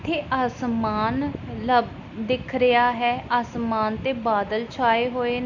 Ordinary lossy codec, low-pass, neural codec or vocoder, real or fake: none; 7.2 kHz; none; real